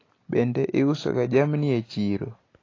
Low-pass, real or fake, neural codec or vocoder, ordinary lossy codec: 7.2 kHz; real; none; AAC, 32 kbps